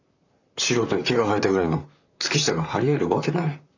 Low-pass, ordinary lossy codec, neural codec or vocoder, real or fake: 7.2 kHz; none; vocoder, 44.1 kHz, 128 mel bands, Pupu-Vocoder; fake